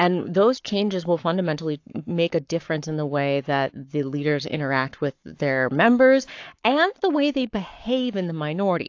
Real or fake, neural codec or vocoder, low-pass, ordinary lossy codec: fake; codec, 44.1 kHz, 7.8 kbps, Pupu-Codec; 7.2 kHz; AAC, 48 kbps